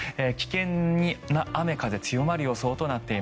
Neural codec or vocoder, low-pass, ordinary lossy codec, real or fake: none; none; none; real